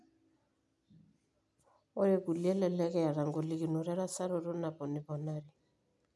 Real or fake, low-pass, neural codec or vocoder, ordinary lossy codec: real; none; none; none